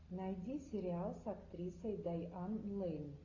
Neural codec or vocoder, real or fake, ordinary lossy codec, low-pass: none; real; AAC, 48 kbps; 7.2 kHz